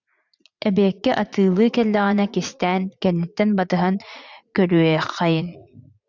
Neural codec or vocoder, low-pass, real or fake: vocoder, 44.1 kHz, 80 mel bands, Vocos; 7.2 kHz; fake